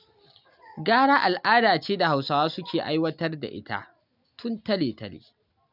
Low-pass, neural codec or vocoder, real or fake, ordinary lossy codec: 5.4 kHz; none; real; none